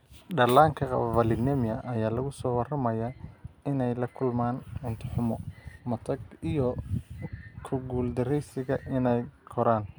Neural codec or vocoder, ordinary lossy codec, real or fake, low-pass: none; none; real; none